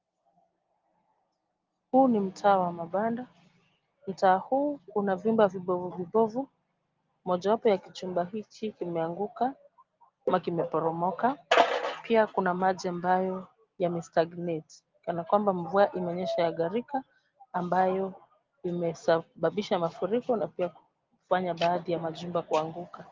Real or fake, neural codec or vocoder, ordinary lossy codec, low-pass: real; none; Opus, 32 kbps; 7.2 kHz